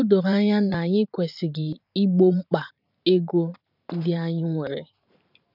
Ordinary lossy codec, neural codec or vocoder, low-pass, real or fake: none; vocoder, 44.1 kHz, 128 mel bands every 512 samples, BigVGAN v2; 5.4 kHz; fake